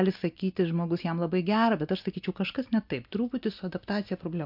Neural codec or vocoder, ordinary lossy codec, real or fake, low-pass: none; MP3, 48 kbps; real; 5.4 kHz